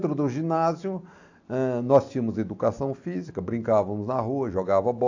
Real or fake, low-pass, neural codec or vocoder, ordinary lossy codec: real; 7.2 kHz; none; AAC, 48 kbps